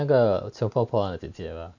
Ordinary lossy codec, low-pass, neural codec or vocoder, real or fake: none; 7.2 kHz; none; real